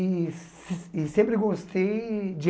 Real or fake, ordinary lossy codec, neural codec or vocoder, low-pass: real; none; none; none